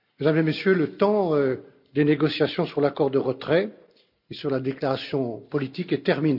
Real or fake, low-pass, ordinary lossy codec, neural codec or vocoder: real; 5.4 kHz; none; none